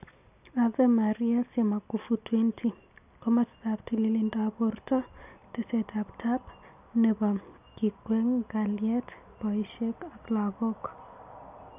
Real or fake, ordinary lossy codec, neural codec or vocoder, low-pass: real; none; none; 3.6 kHz